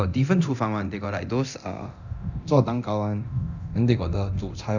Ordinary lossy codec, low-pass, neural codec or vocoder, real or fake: none; 7.2 kHz; codec, 24 kHz, 0.9 kbps, DualCodec; fake